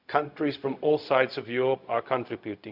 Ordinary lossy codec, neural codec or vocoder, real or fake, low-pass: Opus, 64 kbps; codec, 16 kHz, 0.4 kbps, LongCat-Audio-Codec; fake; 5.4 kHz